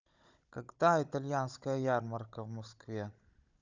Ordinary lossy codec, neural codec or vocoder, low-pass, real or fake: Opus, 64 kbps; codec, 16 kHz, 16 kbps, FunCodec, trained on Chinese and English, 50 frames a second; 7.2 kHz; fake